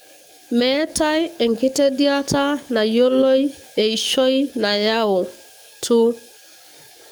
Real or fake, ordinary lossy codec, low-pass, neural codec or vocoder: fake; none; none; codec, 44.1 kHz, 7.8 kbps, DAC